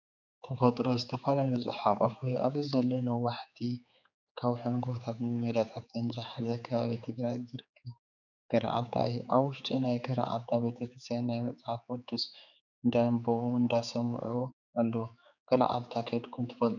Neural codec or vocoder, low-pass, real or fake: codec, 16 kHz, 4 kbps, X-Codec, HuBERT features, trained on general audio; 7.2 kHz; fake